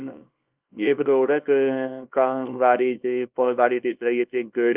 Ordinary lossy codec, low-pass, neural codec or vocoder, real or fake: Opus, 24 kbps; 3.6 kHz; codec, 24 kHz, 0.9 kbps, WavTokenizer, small release; fake